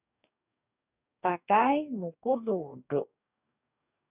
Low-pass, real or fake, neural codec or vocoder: 3.6 kHz; fake; codec, 44.1 kHz, 2.6 kbps, DAC